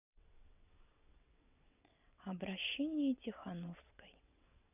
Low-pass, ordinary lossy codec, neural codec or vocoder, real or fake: 3.6 kHz; none; vocoder, 22.05 kHz, 80 mel bands, WaveNeXt; fake